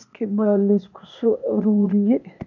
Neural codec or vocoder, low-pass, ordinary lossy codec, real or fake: codec, 16 kHz, 0.8 kbps, ZipCodec; 7.2 kHz; none; fake